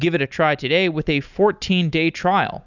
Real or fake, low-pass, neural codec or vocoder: real; 7.2 kHz; none